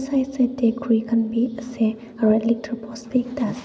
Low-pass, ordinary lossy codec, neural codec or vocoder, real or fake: none; none; none; real